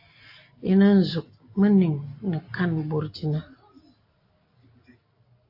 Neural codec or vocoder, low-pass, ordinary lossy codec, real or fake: none; 5.4 kHz; AAC, 32 kbps; real